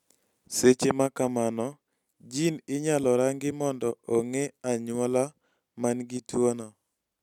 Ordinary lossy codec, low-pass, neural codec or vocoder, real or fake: none; 19.8 kHz; none; real